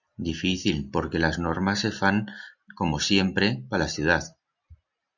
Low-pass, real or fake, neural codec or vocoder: 7.2 kHz; real; none